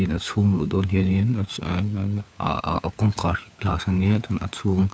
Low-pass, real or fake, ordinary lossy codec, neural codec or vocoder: none; fake; none; codec, 16 kHz, 4 kbps, FunCodec, trained on LibriTTS, 50 frames a second